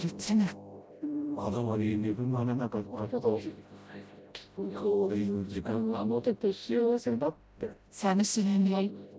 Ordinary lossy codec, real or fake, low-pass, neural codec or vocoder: none; fake; none; codec, 16 kHz, 0.5 kbps, FreqCodec, smaller model